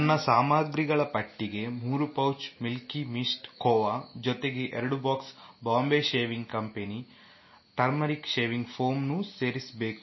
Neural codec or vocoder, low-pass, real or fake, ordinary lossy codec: none; 7.2 kHz; real; MP3, 24 kbps